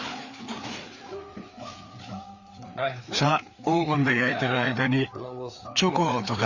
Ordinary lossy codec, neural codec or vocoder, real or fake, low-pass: none; codec, 16 kHz, 4 kbps, FreqCodec, larger model; fake; 7.2 kHz